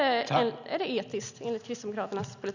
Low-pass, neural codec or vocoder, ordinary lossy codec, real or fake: 7.2 kHz; none; none; real